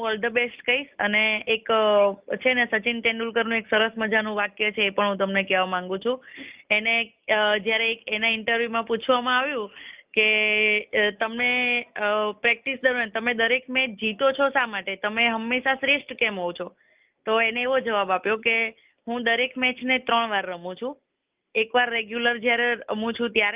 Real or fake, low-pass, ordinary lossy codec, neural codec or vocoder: real; 3.6 kHz; Opus, 24 kbps; none